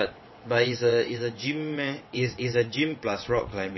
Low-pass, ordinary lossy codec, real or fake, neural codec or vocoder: 7.2 kHz; MP3, 24 kbps; fake; vocoder, 22.05 kHz, 80 mel bands, WaveNeXt